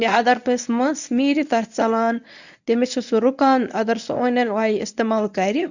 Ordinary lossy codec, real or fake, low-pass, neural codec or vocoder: none; fake; 7.2 kHz; codec, 24 kHz, 0.9 kbps, WavTokenizer, medium speech release version 1